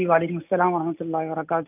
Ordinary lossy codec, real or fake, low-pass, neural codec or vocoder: none; real; 3.6 kHz; none